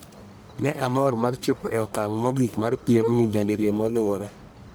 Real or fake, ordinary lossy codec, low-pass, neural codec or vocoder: fake; none; none; codec, 44.1 kHz, 1.7 kbps, Pupu-Codec